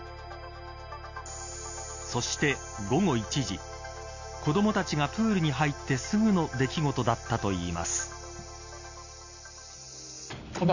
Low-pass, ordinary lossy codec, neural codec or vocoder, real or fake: 7.2 kHz; none; none; real